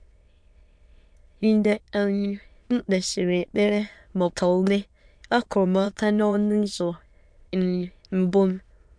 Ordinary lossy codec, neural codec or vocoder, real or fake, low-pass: MP3, 64 kbps; autoencoder, 22.05 kHz, a latent of 192 numbers a frame, VITS, trained on many speakers; fake; 9.9 kHz